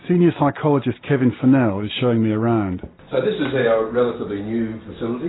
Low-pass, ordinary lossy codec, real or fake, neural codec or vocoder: 7.2 kHz; AAC, 16 kbps; real; none